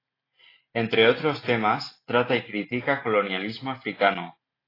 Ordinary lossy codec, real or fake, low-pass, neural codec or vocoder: AAC, 24 kbps; real; 5.4 kHz; none